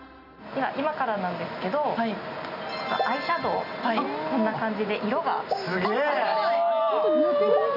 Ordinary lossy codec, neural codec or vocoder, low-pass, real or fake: none; none; 5.4 kHz; real